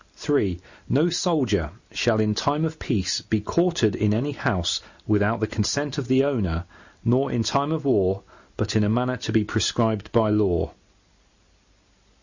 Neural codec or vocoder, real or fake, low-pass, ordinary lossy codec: none; real; 7.2 kHz; Opus, 64 kbps